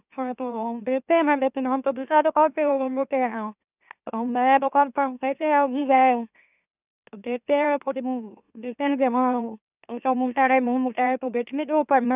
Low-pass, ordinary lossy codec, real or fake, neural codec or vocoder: 3.6 kHz; none; fake; autoencoder, 44.1 kHz, a latent of 192 numbers a frame, MeloTTS